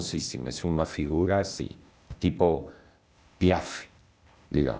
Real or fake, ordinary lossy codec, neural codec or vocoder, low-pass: fake; none; codec, 16 kHz, 0.8 kbps, ZipCodec; none